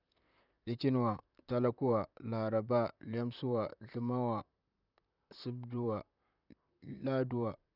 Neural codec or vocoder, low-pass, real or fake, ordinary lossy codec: vocoder, 44.1 kHz, 128 mel bands, Pupu-Vocoder; 5.4 kHz; fake; none